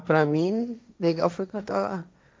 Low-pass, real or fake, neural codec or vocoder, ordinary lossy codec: none; fake; codec, 16 kHz, 1.1 kbps, Voila-Tokenizer; none